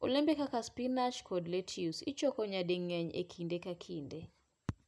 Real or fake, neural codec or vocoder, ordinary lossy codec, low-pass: real; none; none; none